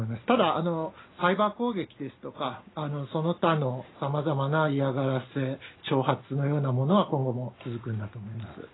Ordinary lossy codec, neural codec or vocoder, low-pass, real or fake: AAC, 16 kbps; none; 7.2 kHz; real